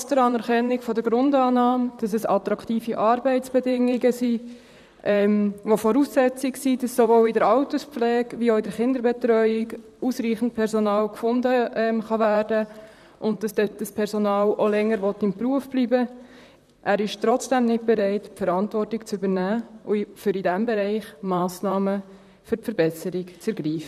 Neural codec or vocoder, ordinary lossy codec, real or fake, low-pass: vocoder, 44.1 kHz, 128 mel bands, Pupu-Vocoder; none; fake; 14.4 kHz